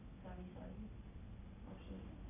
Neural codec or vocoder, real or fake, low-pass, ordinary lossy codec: none; real; 3.6 kHz; none